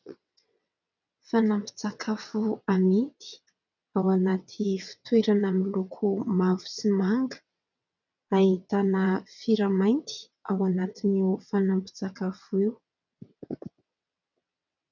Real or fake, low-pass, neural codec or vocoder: fake; 7.2 kHz; vocoder, 22.05 kHz, 80 mel bands, WaveNeXt